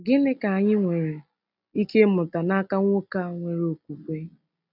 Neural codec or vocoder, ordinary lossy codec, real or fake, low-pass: none; none; real; 5.4 kHz